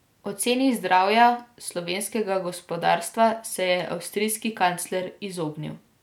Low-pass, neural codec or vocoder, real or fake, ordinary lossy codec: 19.8 kHz; none; real; none